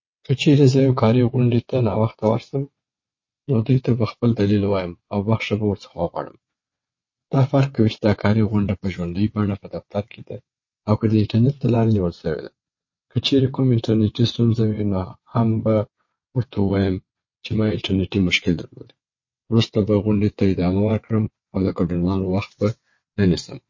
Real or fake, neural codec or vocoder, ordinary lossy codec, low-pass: fake; vocoder, 22.05 kHz, 80 mel bands, WaveNeXt; MP3, 32 kbps; 7.2 kHz